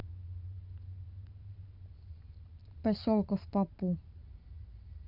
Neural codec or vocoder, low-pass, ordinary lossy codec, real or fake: vocoder, 44.1 kHz, 128 mel bands every 512 samples, BigVGAN v2; 5.4 kHz; none; fake